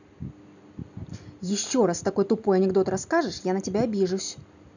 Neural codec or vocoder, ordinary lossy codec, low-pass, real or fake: none; none; 7.2 kHz; real